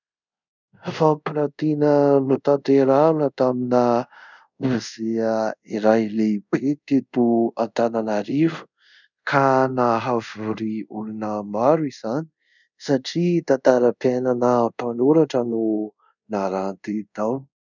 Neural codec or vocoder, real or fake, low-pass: codec, 24 kHz, 0.5 kbps, DualCodec; fake; 7.2 kHz